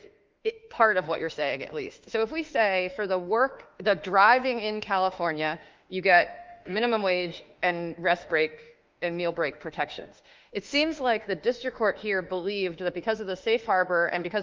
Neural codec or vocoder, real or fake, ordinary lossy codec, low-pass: autoencoder, 48 kHz, 32 numbers a frame, DAC-VAE, trained on Japanese speech; fake; Opus, 24 kbps; 7.2 kHz